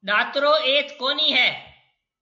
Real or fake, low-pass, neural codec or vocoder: real; 7.2 kHz; none